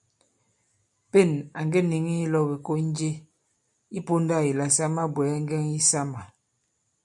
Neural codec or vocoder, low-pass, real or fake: none; 10.8 kHz; real